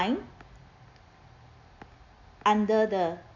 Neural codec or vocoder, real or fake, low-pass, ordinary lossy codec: none; real; 7.2 kHz; AAC, 48 kbps